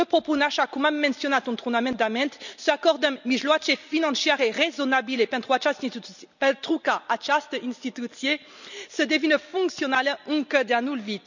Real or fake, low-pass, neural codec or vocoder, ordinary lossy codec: real; 7.2 kHz; none; none